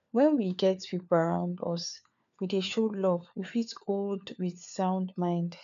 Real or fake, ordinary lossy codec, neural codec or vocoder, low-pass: fake; none; codec, 16 kHz, 4 kbps, FunCodec, trained on LibriTTS, 50 frames a second; 7.2 kHz